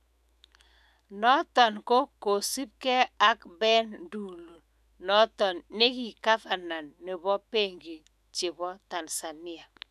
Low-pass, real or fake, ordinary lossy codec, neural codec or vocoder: 14.4 kHz; fake; none; autoencoder, 48 kHz, 128 numbers a frame, DAC-VAE, trained on Japanese speech